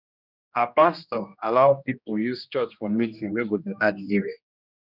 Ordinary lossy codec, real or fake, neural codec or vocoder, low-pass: none; fake; codec, 16 kHz, 1 kbps, X-Codec, HuBERT features, trained on general audio; 5.4 kHz